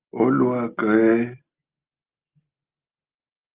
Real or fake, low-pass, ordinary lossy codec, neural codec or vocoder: real; 3.6 kHz; Opus, 24 kbps; none